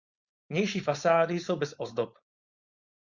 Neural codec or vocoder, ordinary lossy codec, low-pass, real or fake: codec, 16 kHz, 4.8 kbps, FACodec; Opus, 64 kbps; 7.2 kHz; fake